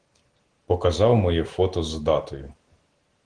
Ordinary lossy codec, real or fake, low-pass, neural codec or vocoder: Opus, 16 kbps; real; 9.9 kHz; none